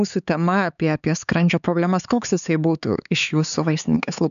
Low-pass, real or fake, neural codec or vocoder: 7.2 kHz; fake; codec, 16 kHz, 4 kbps, X-Codec, HuBERT features, trained on LibriSpeech